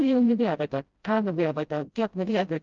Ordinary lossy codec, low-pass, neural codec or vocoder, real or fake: Opus, 32 kbps; 7.2 kHz; codec, 16 kHz, 0.5 kbps, FreqCodec, smaller model; fake